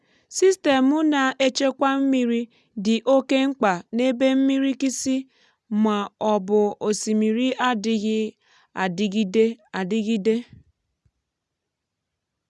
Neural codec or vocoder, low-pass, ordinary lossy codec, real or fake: none; none; none; real